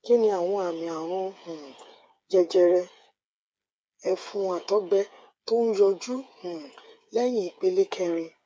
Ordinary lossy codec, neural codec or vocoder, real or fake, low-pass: none; codec, 16 kHz, 8 kbps, FreqCodec, smaller model; fake; none